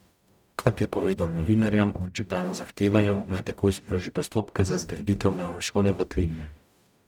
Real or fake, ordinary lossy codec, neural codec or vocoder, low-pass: fake; none; codec, 44.1 kHz, 0.9 kbps, DAC; 19.8 kHz